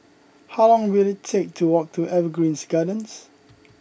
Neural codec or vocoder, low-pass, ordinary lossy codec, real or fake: none; none; none; real